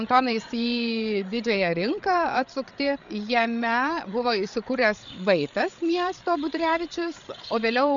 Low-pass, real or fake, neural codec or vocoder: 7.2 kHz; fake; codec, 16 kHz, 8 kbps, FreqCodec, larger model